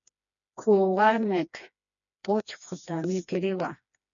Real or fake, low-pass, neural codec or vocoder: fake; 7.2 kHz; codec, 16 kHz, 2 kbps, FreqCodec, smaller model